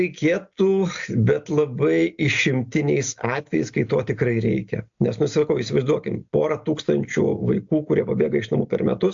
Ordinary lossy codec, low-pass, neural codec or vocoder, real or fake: AAC, 64 kbps; 7.2 kHz; none; real